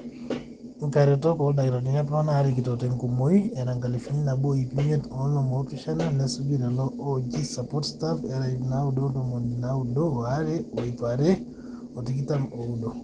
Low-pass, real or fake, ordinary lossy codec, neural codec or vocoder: 9.9 kHz; real; Opus, 16 kbps; none